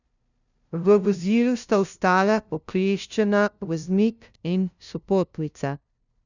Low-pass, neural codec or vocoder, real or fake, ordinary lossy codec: 7.2 kHz; codec, 16 kHz, 0.5 kbps, FunCodec, trained on LibriTTS, 25 frames a second; fake; none